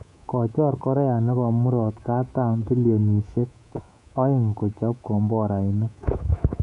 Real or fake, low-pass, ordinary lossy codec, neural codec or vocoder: fake; 10.8 kHz; none; codec, 24 kHz, 3.1 kbps, DualCodec